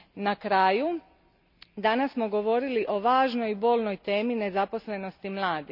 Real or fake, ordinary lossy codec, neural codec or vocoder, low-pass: real; none; none; 5.4 kHz